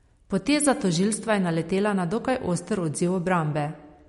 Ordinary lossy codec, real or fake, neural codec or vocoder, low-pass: MP3, 48 kbps; real; none; 10.8 kHz